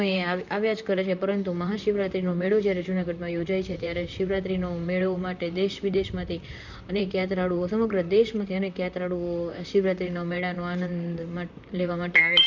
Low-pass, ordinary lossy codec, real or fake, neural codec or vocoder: 7.2 kHz; none; fake; vocoder, 44.1 kHz, 128 mel bands, Pupu-Vocoder